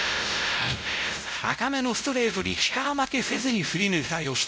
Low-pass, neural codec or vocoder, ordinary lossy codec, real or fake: none; codec, 16 kHz, 0.5 kbps, X-Codec, WavLM features, trained on Multilingual LibriSpeech; none; fake